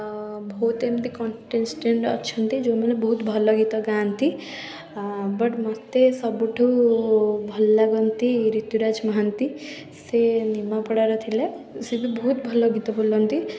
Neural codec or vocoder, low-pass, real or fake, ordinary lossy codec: none; none; real; none